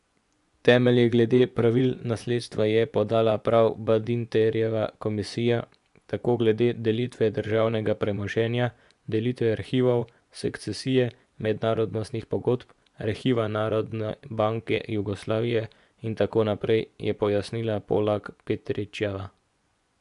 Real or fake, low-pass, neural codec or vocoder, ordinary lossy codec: fake; 10.8 kHz; vocoder, 24 kHz, 100 mel bands, Vocos; none